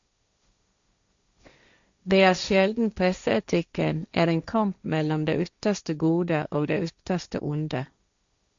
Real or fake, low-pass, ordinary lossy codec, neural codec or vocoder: fake; 7.2 kHz; Opus, 64 kbps; codec, 16 kHz, 1.1 kbps, Voila-Tokenizer